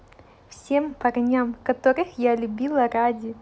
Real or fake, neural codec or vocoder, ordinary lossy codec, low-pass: real; none; none; none